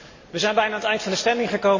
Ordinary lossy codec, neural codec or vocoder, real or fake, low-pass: MP3, 32 kbps; none; real; 7.2 kHz